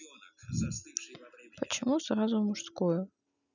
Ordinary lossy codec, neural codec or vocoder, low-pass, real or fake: none; none; 7.2 kHz; real